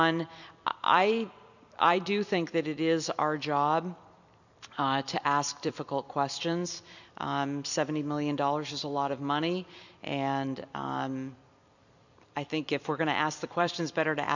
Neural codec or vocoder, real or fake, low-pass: vocoder, 44.1 kHz, 128 mel bands every 256 samples, BigVGAN v2; fake; 7.2 kHz